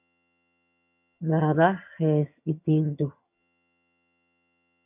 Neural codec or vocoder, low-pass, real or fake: vocoder, 22.05 kHz, 80 mel bands, HiFi-GAN; 3.6 kHz; fake